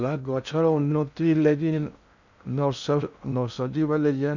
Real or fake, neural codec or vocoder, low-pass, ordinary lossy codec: fake; codec, 16 kHz in and 24 kHz out, 0.6 kbps, FocalCodec, streaming, 2048 codes; 7.2 kHz; none